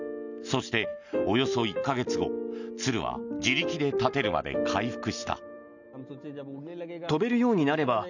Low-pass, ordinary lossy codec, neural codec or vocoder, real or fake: 7.2 kHz; none; none; real